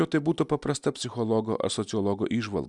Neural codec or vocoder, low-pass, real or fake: none; 10.8 kHz; real